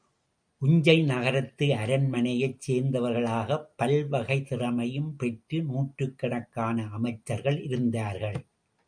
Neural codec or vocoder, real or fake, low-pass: none; real; 9.9 kHz